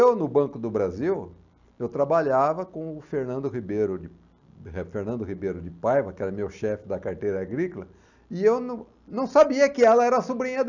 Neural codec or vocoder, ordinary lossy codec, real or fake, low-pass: none; none; real; 7.2 kHz